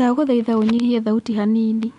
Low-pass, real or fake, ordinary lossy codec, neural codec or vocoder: 10.8 kHz; real; none; none